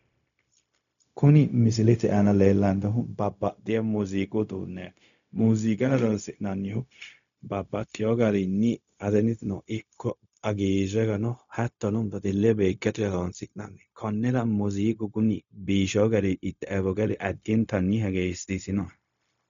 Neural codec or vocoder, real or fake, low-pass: codec, 16 kHz, 0.4 kbps, LongCat-Audio-Codec; fake; 7.2 kHz